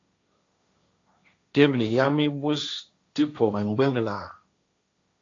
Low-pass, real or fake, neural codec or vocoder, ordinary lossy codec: 7.2 kHz; fake; codec, 16 kHz, 1.1 kbps, Voila-Tokenizer; AAC, 48 kbps